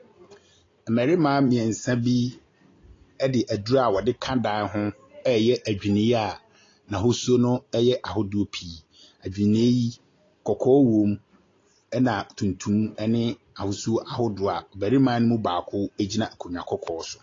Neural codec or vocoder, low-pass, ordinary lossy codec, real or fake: none; 7.2 kHz; AAC, 32 kbps; real